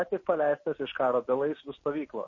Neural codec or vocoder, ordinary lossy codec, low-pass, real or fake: none; MP3, 32 kbps; 7.2 kHz; real